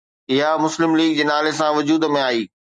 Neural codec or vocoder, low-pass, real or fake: none; 10.8 kHz; real